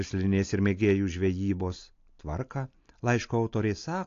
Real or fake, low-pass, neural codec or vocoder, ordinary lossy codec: real; 7.2 kHz; none; AAC, 48 kbps